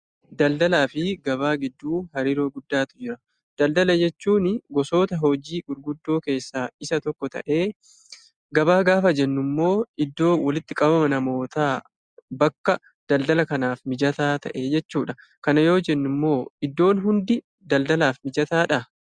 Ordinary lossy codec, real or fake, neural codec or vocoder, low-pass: Opus, 64 kbps; real; none; 9.9 kHz